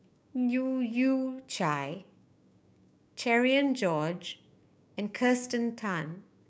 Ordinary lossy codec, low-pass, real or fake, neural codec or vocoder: none; none; fake; codec, 16 kHz, 6 kbps, DAC